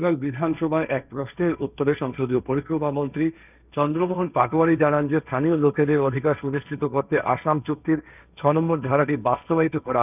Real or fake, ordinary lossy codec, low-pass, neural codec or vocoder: fake; none; 3.6 kHz; codec, 16 kHz, 1.1 kbps, Voila-Tokenizer